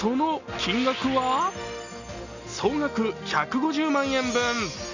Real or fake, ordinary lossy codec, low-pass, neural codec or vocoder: real; none; 7.2 kHz; none